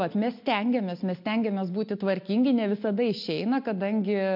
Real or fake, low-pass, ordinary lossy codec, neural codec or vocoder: real; 5.4 kHz; MP3, 48 kbps; none